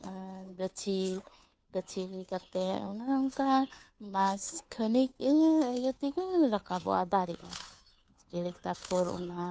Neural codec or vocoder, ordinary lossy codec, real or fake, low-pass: codec, 16 kHz, 2 kbps, FunCodec, trained on Chinese and English, 25 frames a second; none; fake; none